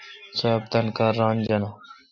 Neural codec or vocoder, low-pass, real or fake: none; 7.2 kHz; real